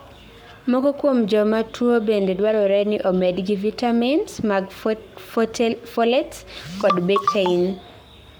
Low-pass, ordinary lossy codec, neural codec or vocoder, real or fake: none; none; codec, 44.1 kHz, 7.8 kbps, Pupu-Codec; fake